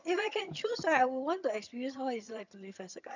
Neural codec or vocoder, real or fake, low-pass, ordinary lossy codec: vocoder, 22.05 kHz, 80 mel bands, HiFi-GAN; fake; 7.2 kHz; none